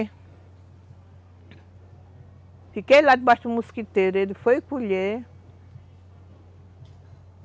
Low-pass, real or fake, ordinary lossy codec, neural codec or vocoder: none; real; none; none